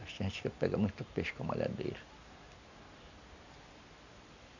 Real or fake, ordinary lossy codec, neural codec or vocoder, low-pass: real; none; none; 7.2 kHz